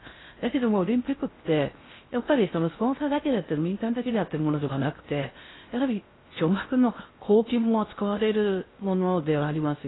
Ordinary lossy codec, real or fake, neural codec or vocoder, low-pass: AAC, 16 kbps; fake; codec, 16 kHz in and 24 kHz out, 0.6 kbps, FocalCodec, streaming, 4096 codes; 7.2 kHz